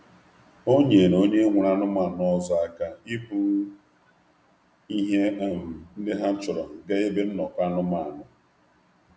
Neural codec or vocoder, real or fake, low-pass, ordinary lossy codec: none; real; none; none